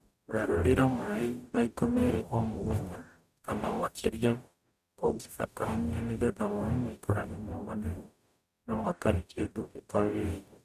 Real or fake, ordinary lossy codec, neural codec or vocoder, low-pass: fake; none; codec, 44.1 kHz, 0.9 kbps, DAC; 14.4 kHz